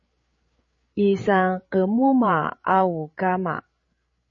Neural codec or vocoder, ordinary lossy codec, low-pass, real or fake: codec, 16 kHz, 8 kbps, FreqCodec, larger model; MP3, 32 kbps; 7.2 kHz; fake